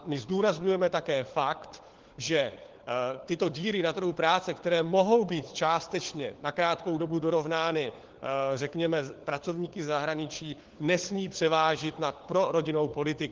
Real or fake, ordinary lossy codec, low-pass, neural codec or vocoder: fake; Opus, 16 kbps; 7.2 kHz; codec, 16 kHz, 4 kbps, FunCodec, trained on LibriTTS, 50 frames a second